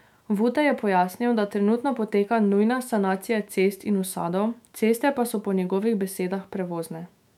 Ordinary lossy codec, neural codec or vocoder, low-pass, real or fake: MP3, 96 kbps; autoencoder, 48 kHz, 128 numbers a frame, DAC-VAE, trained on Japanese speech; 19.8 kHz; fake